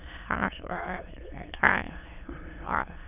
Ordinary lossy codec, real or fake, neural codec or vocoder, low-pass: none; fake; autoencoder, 22.05 kHz, a latent of 192 numbers a frame, VITS, trained on many speakers; 3.6 kHz